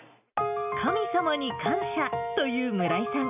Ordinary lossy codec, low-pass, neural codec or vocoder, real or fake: none; 3.6 kHz; none; real